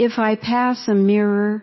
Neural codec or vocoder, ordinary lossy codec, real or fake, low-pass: none; MP3, 24 kbps; real; 7.2 kHz